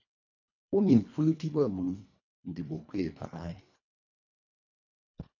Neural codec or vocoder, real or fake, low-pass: codec, 24 kHz, 1.5 kbps, HILCodec; fake; 7.2 kHz